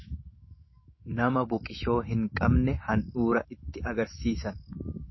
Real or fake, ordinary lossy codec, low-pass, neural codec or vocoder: fake; MP3, 24 kbps; 7.2 kHz; autoencoder, 48 kHz, 128 numbers a frame, DAC-VAE, trained on Japanese speech